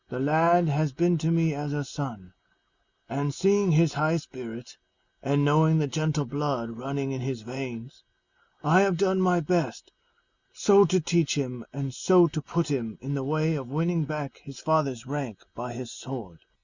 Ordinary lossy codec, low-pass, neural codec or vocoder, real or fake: Opus, 64 kbps; 7.2 kHz; none; real